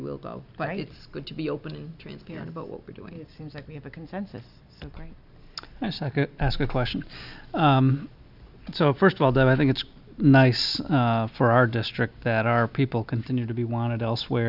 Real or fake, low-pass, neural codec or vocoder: real; 5.4 kHz; none